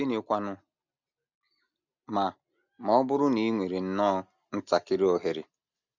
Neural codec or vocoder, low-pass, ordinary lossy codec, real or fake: none; 7.2 kHz; none; real